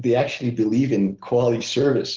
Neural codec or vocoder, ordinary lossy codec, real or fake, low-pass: none; Opus, 16 kbps; real; 7.2 kHz